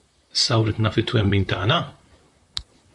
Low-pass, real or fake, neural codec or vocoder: 10.8 kHz; fake; vocoder, 44.1 kHz, 128 mel bands, Pupu-Vocoder